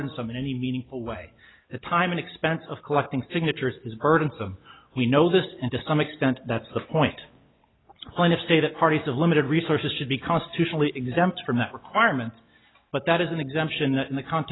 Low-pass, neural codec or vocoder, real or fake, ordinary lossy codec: 7.2 kHz; none; real; AAC, 16 kbps